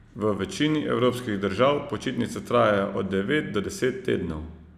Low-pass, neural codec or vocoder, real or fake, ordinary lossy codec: 14.4 kHz; none; real; none